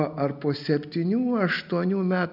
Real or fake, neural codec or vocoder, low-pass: real; none; 5.4 kHz